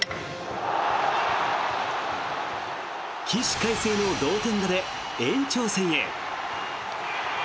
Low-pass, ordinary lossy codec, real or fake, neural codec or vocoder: none; none; real; none